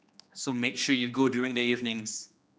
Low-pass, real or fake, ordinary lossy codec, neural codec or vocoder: none; fake; none; codec, 16 kHz, 2 kbps, X-Codec, HuBERT features, trained on general audio